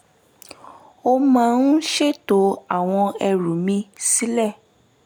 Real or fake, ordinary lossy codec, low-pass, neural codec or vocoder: real; none; none; none